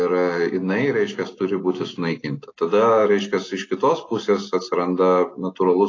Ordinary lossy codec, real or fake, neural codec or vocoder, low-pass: AAC, 32 kbps; real; none; 7.2 kHz